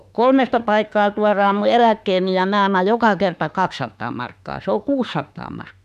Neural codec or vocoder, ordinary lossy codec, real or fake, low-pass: autoencoder, 48 kHz, 32 numbers a frame, DAC-VAE, trained on Japanese speech; none; fake; 14.4 kHz